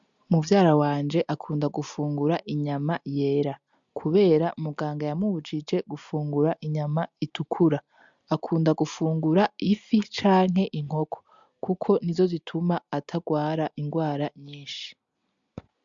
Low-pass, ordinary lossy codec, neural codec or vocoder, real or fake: 7.2 kHz; MP3, 64 kbps; none; real